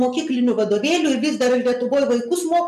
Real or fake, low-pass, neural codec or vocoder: real; 14.4 kHz; none